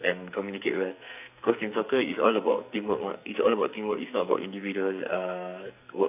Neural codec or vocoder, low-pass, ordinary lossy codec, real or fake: codec, 44.1 kHz, 2.6 kbps, SNAC; 3.6 kHz; AAC, 32 kbps; fake